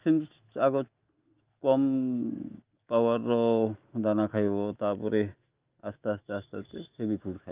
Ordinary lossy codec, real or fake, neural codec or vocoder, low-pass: Opus, 24 kbps; fake; autoencoder, 48 kHz, 128 numbers a frame, DAC-VAE, trained on Japanese speech; 3.6 kHz